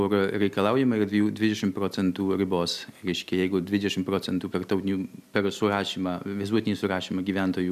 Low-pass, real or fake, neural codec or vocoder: 14.4 kHz; real; none